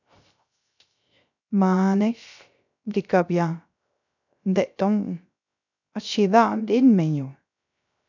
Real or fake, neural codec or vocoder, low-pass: fake; codec, 16 kHz, 0.3 kbps, FocalCodec; 7.2 kHz